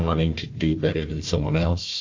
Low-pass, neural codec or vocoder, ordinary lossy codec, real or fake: 7.2 kHz; codec, 16 kHz, 1 kbps, FunCodec, trained on Chinese and English, 50 frames a second; MP3, 48 kbps; fake